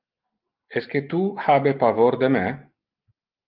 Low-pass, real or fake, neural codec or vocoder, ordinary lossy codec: 5.4 kHz; real; none; Opus, 24 kbps